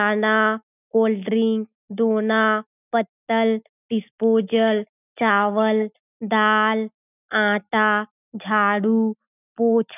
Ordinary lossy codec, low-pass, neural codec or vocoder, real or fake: none; 3.6 kHz; none; real